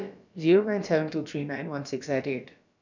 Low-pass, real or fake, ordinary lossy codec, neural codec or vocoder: 7.2 kHz; fake; none; codec, 16 kHz, about 1 kbps, DyCAST, with the encoder's durations